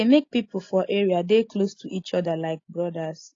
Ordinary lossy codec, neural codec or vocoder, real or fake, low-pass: AAC, 32 kbps; none; real; 7.2 kHz